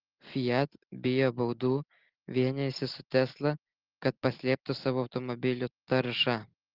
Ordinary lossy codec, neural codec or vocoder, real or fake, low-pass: Opus, 16 kbps; none; real; 5.4 kHz